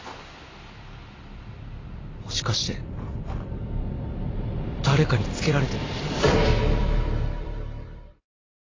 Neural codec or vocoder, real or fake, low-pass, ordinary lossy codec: vocoder, 44.1 kHz, 128 mel bands every 256 samples, BigVGAN v2; fake; 7.2 kHz; AAC, 32 kbps